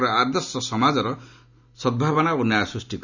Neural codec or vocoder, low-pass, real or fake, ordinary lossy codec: none; 7.2 kHz; real; none